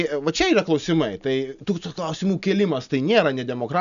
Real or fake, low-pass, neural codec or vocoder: real; 7.2 kHz; none